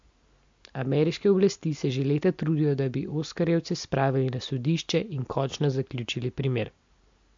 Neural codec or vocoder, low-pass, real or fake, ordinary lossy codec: none; 7.2 kHz; real; MP3, 48 kbps